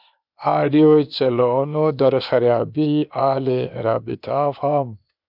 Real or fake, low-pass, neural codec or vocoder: fake; 5.4 kHz; codec, 16 kHz, 0.8 kbps, ZipCodec